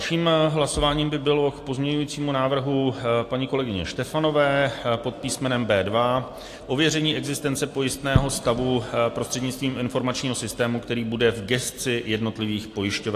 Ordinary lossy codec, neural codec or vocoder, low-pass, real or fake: AAC, 64 kbps; none; 14.4 kHz; real